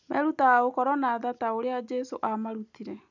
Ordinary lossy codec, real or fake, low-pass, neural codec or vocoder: none; real; 7.2 kHz; none